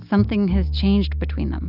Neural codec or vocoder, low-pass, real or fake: none; 5.4 kHz; real